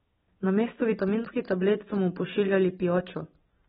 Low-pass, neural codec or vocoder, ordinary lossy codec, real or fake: 7.2 kHz; none; AAC, 16 kbps; real